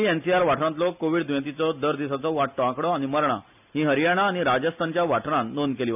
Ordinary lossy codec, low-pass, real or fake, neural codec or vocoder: none; 3.6 kHz; real; none